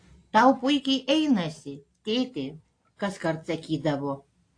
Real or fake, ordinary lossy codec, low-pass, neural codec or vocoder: real; AAC, 48 kbps; 9.9 kHz; none